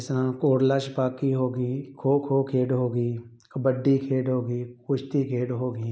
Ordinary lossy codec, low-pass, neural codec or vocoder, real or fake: none; none; none; real